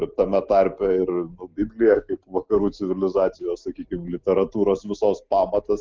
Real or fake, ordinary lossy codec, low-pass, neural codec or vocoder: real; Opus, 24 kbps; 7.2 kHz; none